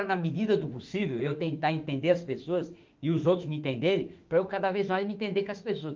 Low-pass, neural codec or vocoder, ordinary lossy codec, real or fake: 7.2 kHz; autoencoder, 48 kHz, 32 numbers a frame, DAC-VAE, trained on Japanese speech; Opus, 32 kbps; fake